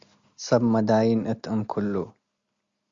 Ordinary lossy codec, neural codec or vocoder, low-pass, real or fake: Opus, 64 kbps; none; 7.2 kHz; real